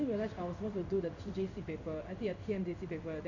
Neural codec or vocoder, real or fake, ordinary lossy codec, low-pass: codec, 16 kHz in and 24 kHz out, 1 kbps, XY-Tokenizer; fake; none; 7.2 kHz